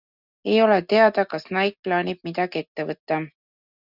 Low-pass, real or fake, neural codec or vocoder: 5.4 kHz; real; none